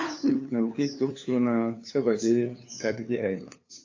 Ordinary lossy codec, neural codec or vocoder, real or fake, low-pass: AAC, 48 kbps; codec, 16 kHz, 2 kbps, FunCodec, trained on LibriTTS, 25 frames a second; fake; 7.2 kHz